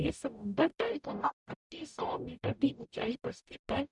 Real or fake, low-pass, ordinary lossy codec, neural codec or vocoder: fake; 10.8 kHz; none; codec, 44.1 kHz, 0.9 kbps, DAC